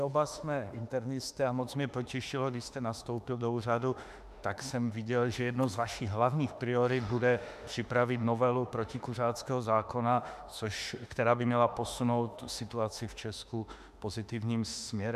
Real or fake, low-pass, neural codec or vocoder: fake; 14.4 kHz; autoencoder, 48 kHz, 32 numbers a frame, DAC-VAE, trained on Japanese speech